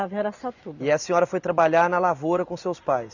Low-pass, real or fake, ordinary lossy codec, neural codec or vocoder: 7.2 kHz; real; none; none